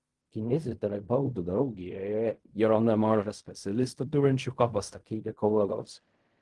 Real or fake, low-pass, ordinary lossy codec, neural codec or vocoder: fake; 10.8 kHz; Opus, 24 kbps; codec, 16 kHz in and 24 kHz out, 0.4 kbps, LongCat-Audio-Codec, fine tuned four codebook decoder